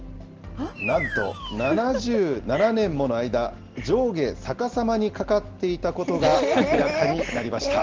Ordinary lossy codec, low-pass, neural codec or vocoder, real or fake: Opus, 16 kbps; 7.2 kHz; none; real